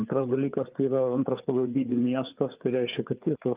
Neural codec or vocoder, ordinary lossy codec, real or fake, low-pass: codec, 16 kHz, 4 kbps, FreqCodec, larger model; Opus, 24 kbps; fake; 3.6 kHz